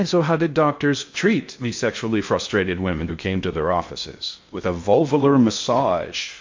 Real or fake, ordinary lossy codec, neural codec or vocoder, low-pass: fake; MP3, 48 kbps; codec, 16 kHz in and 24 kHz out, 0.6 kbps, FocalCodec, streaming, 2048 codes; 7.2 kHz